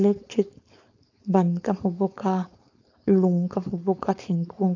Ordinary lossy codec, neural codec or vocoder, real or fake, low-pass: AAC, 48 kbps; codec, 16 kHz, 4.8 kbps, FACodec; fake; 7.2 kHz